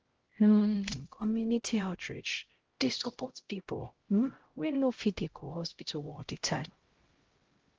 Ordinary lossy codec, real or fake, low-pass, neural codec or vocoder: Opus, 16 kbps; fake; 7.2 kHz; codec, 16 kHz, 0.5 kbps, X-Codec, HuBERT features, trained on LibriSpeech